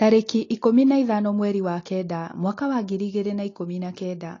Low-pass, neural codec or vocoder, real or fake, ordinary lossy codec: 7.2 kHz; none; real; AAC, 32 kbps